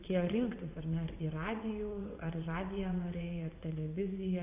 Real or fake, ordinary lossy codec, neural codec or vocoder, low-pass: fake; AAC, 32 kbps; vocoder, 22.05 kHz, 80 mel bands, WaveNeXt; 3.6 kHz